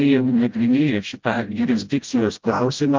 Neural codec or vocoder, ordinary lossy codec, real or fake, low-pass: codec, 16 kHz, 0.5 kbps, FreqCodec, smaller model; Opus, 32 kbps; fake; 7.2 kHz